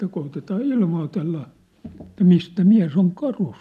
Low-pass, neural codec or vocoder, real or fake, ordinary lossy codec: 14.4 kHz; none; real; none